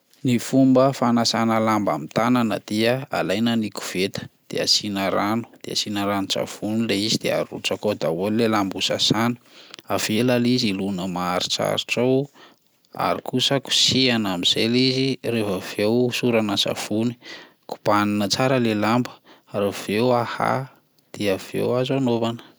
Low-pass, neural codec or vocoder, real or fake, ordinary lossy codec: none; none; real; none